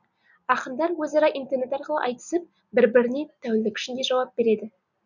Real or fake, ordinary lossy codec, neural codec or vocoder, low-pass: real; none; none; 7.2 kHz